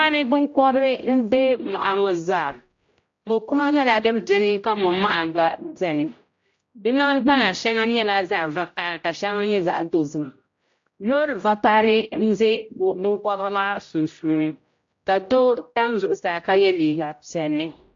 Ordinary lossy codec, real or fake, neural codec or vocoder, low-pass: AAC, 48 kbps; fake; codec, 16 kHz, 0.5 kbps, X-Codec, HuBERT features, trained on general audio; 7.2 kHz